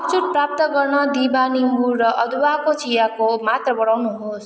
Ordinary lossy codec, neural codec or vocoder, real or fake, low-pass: none; none; real; none